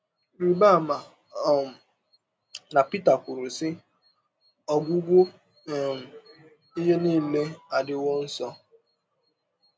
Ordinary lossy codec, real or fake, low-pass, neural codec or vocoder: none; real; none; none